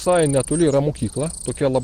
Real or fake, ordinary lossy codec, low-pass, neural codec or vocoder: real; Opus, 16 kbps; 14.4 kHz; none